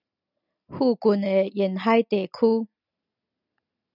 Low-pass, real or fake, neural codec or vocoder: 5.4 kHz; real; none